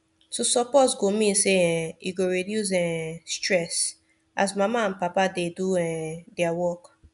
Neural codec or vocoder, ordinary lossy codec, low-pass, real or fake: none; none; 10.8 kHz; real